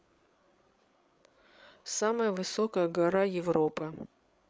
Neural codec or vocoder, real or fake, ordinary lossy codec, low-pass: codec, 16 kHz, 8 kbps, FreqCodec, larger model; fake; none; none